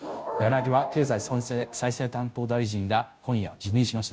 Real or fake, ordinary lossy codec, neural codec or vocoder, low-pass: fake; none; codec, 16 kHz, 0.5 kbps, FunCodec, trained on Chinese and English, 25 frames a second; none